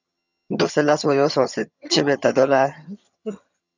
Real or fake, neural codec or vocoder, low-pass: fake; vocoder, 22.05 kHz, 80 mel bands, HiFi-GAN; 7.2 kHz